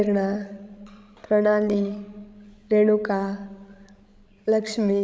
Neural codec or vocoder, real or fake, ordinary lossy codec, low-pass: codec, 16 kHz, 16 kbps, FreqCodec, larger model; fake; none; none